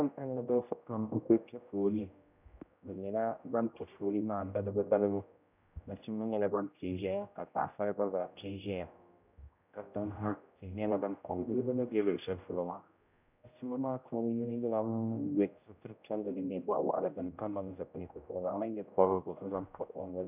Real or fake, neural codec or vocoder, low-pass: fake; codec, 16 kHz, 0.5 kbps, X-Codec, HuBERT features, trained on general audio; 3.6 kHz